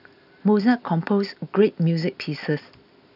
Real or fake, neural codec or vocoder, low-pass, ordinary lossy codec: real; none; 5.4 kHz; none